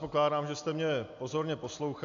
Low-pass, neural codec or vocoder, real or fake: 7.2 kHz; none; real